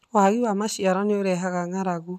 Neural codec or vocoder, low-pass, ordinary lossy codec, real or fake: none; 14.4 kHz; none; real